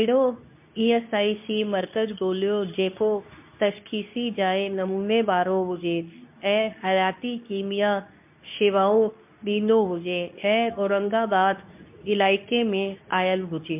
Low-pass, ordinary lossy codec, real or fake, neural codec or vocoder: 3.6 kHz; MP3, 32 kbps; fake; codec, 24 kHz, 0.9 kbps, WavTokenizer, medium speech release version 2